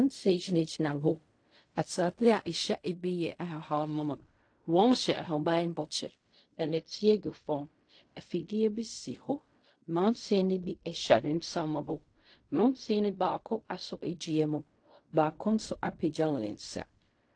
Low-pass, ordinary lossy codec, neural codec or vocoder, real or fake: 9.9 kHz; AAC, 48 kbps; codec, 16 kHz in and 24 kHz out, 0.4 kbps, LongCat-Audio-Codec, fine tuned four codebook decoder; fake